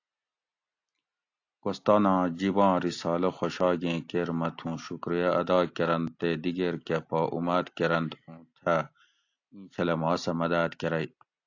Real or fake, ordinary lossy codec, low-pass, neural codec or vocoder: real; AAC, 48 kbps; 7.2 kHz; none